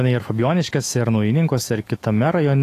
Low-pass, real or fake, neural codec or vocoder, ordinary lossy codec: 14.4 kHz; fake; autoencoder, 48 kHz, 128 numbers a frame, DAC-VAE, trained on Japanese speech; AAC, 48 kbps